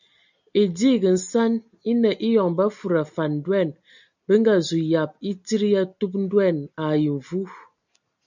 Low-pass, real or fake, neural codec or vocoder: 7.2 kHz; real; none